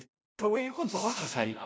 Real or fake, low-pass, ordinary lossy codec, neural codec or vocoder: fake; none; none; codec, 16 kHz, 0.5 kbps, FunCodec, trained on LibriTTS, 25 frames a second